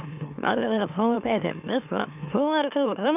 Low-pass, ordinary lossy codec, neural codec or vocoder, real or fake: 3.6 kHz; none; autoencoder, 44.1 kHz, a latent of 192 numbers a frame, MeloTTS; fake